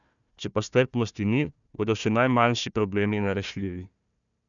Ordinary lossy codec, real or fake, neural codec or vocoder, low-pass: none; fake; codec, 16 kHz, 1 kbps, FunCodec, trained on Chinese and English, 50 frames a second; 7.2 kHz